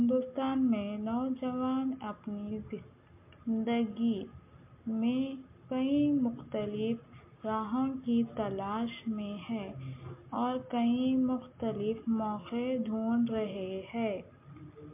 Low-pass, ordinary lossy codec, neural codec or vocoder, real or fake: 3.6 kHz; MP3, 32 kbps; none; real